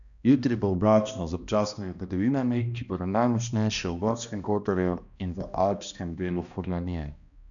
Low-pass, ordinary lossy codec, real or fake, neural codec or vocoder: 7.2 kHz; none; fake; codec, 16 kHz, 1 kbps, X-Codec, HuBERT features, trained on balanced general audio